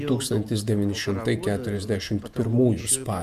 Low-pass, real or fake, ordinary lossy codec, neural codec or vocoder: 14.4 kHz; fake; MP3, 96 kbps; vocoder, 48 kHz, 128 mel bands, Vocos